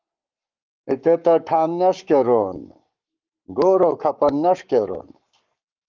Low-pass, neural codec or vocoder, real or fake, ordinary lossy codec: 7.2 kHz; codec, 44.1 kHz, 7.8 kbps, Pupu-Codec; fake; Opus, 32 kbps